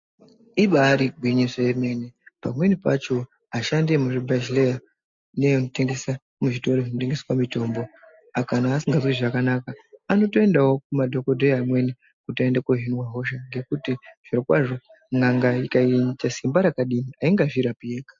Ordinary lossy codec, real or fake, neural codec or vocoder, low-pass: MP3, 48 kbps; real; none; 7.2 kHz